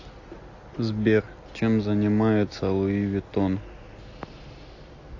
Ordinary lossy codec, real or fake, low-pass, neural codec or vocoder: AAC, 48 kbps; real; 7.2 kHz; none